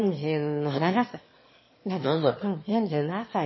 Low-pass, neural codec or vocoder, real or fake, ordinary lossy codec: 7.2 kHz; autoencoder, 22.05 kHz, a latent of 192 numbers a frame, VITS, trained on one speaker; fake; MP3, 24 kbps